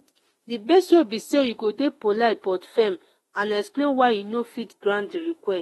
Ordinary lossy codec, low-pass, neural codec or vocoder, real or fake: AAC, 32 kbps; 19.8 kHz; autoencoder, 48 kHz, 32 numbers a frame, DAC-VAE, trained on Japanese speech; fake